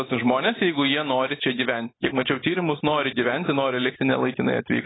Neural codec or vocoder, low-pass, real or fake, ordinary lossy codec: none; 7.2 kHz; real; AAC, 16 kbps